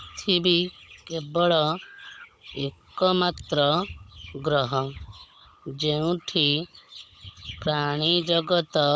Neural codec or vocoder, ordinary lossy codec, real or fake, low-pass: codec, 16 kHz, 16 kbps, FunCodec, trained on Chinese and English, 50 frames a second; none; fake; none